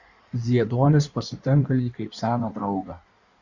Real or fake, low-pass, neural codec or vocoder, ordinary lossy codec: fake; 7.2 kHz; codec, 16 kHz in and 24 kHz out, 1.1 kbps, FireRedTTS-2 codec; Opus, 64 kbps